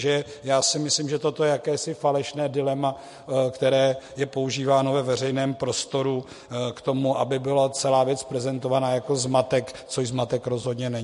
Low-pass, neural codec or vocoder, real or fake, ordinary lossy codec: 14.4 kHz; none; real; MP3, 48 kbps